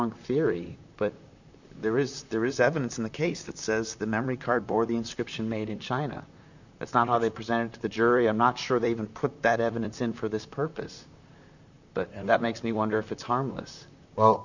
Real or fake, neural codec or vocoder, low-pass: fake; vocoder, 44.1 kHz, 128 mel bands, Pupu-Vocoder; 7.2 kHz